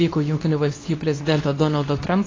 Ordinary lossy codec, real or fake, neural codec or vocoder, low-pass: AAC, 32 kbps; fake; codec, 24 kHz, 0.9 kbps, WavTokenizer, medium speech release version 2; 7.2 kHz